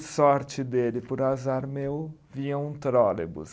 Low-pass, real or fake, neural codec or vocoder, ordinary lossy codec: none; real; none; none